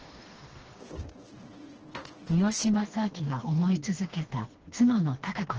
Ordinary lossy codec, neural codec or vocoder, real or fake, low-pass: Opus, 16 kbps; codec, 16 kHz, 2 kbps, FreqCodec, smaller model; fake; 7.2 kHz